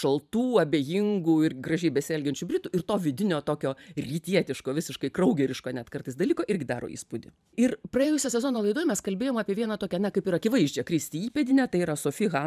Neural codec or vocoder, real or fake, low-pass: vocoder, 44.1 kHz, 128 mel bands every 512 samples, BigVGAN v2; fake; 14.4 kHz